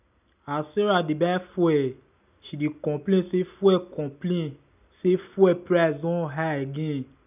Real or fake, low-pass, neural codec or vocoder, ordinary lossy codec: real; 3.6 kHz; none; none